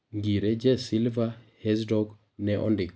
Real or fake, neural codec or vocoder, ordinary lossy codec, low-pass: real; none; none; none